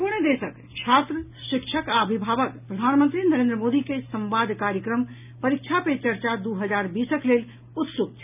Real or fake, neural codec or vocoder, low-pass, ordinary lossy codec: real; none; 3.6 kHz; none